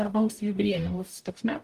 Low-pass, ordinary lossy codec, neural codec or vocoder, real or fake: 14.4 kHz; Opus, 32 kbps; codec, 44.1 kHz, 0.9 kbps, DAC; fake